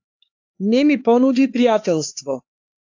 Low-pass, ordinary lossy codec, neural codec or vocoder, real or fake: 7.2 kHz; AAC, 48 kbps; codec, 16 kHz, 4 kbps, X-Codec, HuBERT features, trained on LibriSpeech; fake